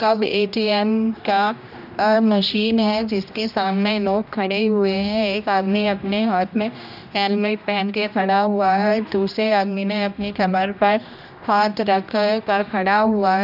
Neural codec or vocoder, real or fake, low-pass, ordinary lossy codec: codec, 16 kHz, 1 kbps, X-Codec, HuBERT features, trained on general audio; fake; 5.4 kHz; none